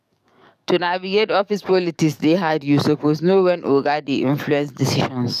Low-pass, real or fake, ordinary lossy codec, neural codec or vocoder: 14.4 kHz; fake; none; codec, 44.1 kHz, 7.8 kbps, DAC